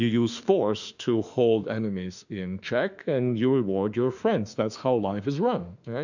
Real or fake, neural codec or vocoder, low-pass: fake; autoencoder, 48 kHz, 32 numbers a frame, DAC-VAE, trained on Japanese speech; 7.2 kHz